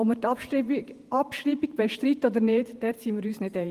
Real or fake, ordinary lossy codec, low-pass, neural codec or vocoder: fake; Opus, 24 kbps; 14.4 kHz; vocoder, 48 kHz, 128 mel bands, Vocos